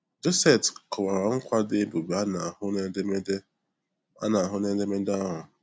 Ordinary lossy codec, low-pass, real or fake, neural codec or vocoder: none; none; real; none